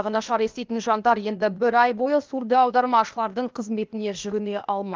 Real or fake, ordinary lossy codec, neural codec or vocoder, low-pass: fake; Opus, 32 kbps; codec, 16 kHz, 0.8 kbps, ZipCodec; 7.2 kHz